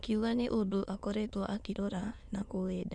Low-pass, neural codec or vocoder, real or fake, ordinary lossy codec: 9.9 kHz; autoencoder, 22.05 kHz, a latent of 192 numbers a frame, VITS, trained on many speakers; fake; none